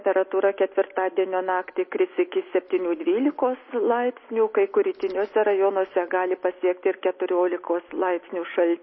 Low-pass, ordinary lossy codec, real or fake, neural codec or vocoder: 7.2 kHz; MP3, 24 kbps; real; none